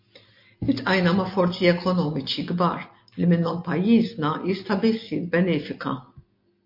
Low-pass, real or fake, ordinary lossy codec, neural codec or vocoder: 5.4 kHz; real; MP3, 32 kbps; none